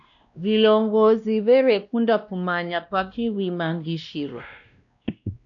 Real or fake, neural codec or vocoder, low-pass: fake; codec, 16 kHz, 1 kbps, X-Codec, WavLM features, trained on Multilingual LibriSpeech; 7.2 kHz